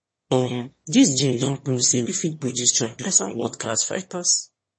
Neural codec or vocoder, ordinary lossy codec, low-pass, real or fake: autoencoder, 22.05 kHz, a latent of 192 numbers a frame, VITS, trained on one speaker; MP3, 32 kbps; 9.9 kHz; fake